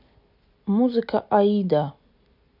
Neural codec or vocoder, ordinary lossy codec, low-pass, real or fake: none; none; 5.4 kHz; real